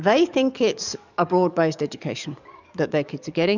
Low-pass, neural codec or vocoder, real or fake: 7.2 kHz; codec, 44.1 kHz, 7.8 kbps, DAC; fake